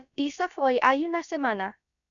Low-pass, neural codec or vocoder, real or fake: 7.2 kHz; codec, 16 kHz, about 1 kbps, DyCAST, with the encoder's durations; fake